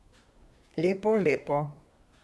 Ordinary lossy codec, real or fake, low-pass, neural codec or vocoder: none; fake; none; codec, 24 kHz, 1 kbps, SNAC